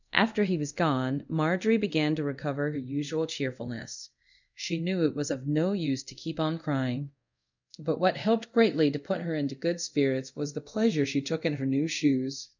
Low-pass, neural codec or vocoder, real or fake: 7.2 kHz; codec, 24 kHz, 0.5 kbps, DualCodec; fake